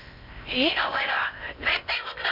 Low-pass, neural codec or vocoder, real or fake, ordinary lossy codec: 5.4 kHz; codec, 16 kHz in and 24 kHz out, 0.8 kbps, FocalCodec, streaming, 65536 codes; fake; none